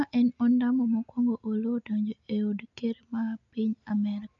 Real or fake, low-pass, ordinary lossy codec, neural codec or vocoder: real; 7.2 kHz; none; none